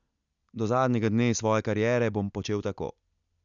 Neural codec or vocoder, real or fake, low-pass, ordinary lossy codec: none; real; 7.2 kHz; none